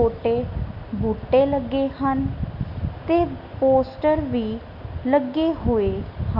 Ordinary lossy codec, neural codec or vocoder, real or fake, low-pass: none; none; real; 5.4 kHz